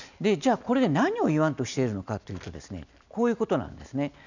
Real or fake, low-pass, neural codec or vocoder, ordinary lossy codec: real; 7.2 kHz; none; none